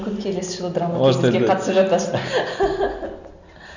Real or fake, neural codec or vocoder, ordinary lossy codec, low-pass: real; none; none; 7.2 kHz